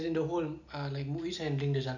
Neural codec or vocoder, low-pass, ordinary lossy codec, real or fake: none; 7.2 kHz; none; real